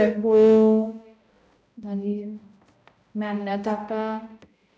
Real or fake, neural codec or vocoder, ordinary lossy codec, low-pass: fake; codec, 16 kHz, 0.5 kbps, X-Codec, HuBERT features, trained on balanced general audio; none; none